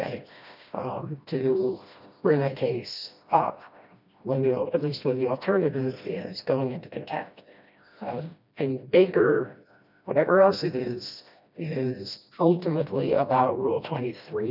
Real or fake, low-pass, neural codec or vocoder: fake; 5.4 kHz; codec, 16 kHz, 1 kbps, FreqCodec, smaller model